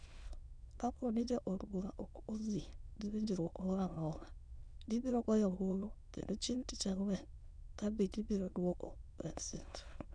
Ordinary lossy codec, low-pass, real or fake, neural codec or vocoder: none; 9.9 kHz; fake; autoencoder, 22.05 kHz, a latent of 192 numbers a frame, VITS, trained on many speakers